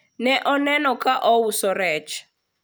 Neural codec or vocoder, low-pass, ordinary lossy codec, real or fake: none; none; none; real